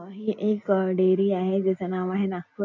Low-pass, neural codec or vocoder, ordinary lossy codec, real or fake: 7.2 kHz; none; none; real